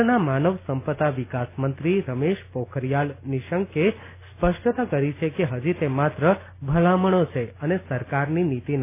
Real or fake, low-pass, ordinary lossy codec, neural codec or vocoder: real; 3.6 kHz; none; none